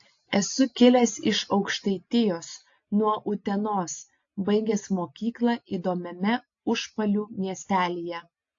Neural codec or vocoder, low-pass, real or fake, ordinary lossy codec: none; 7.2 kHz; real; AAC, 48 kbps